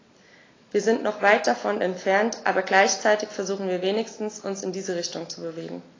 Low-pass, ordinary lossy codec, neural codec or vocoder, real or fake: 7.2 kHz; AAC, 32 kbps; none; real